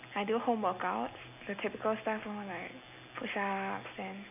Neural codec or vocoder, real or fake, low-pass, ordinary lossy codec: none; real; 3.6 kHz; AAC, 32 kbps